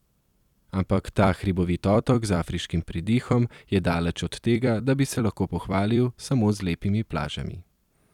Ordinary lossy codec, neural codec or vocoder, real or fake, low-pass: none; vocoder, 44.1 kHz, 128 mel bands every 256 samples, BigVGAN v2; fake; 19.8 kHz